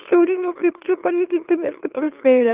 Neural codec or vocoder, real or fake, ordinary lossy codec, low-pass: autoencoder, 44.1 kHz, a latent of 192 numbers a frame, MeloTTS; fake; Opus, 24 kbps; 3.6 kHz